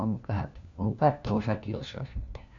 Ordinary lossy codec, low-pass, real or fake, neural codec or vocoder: none; 7.2 kHz; fake; codec, 16 kHz, 1 kbps, FunCodec, trained on LibriTTS, 50 frames a second